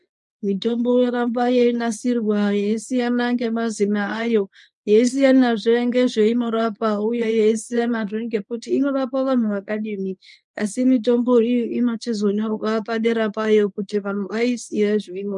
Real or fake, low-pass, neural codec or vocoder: fake; 10.8 kHz; codec, 24 kHz, 0.9 kbps, WavTokenizer, medium speech release version 1